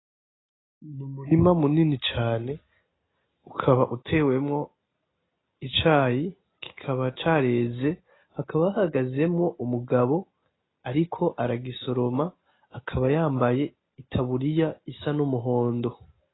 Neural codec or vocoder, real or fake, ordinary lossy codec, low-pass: none; real; AAC, 16 kbps; 7.2 kHz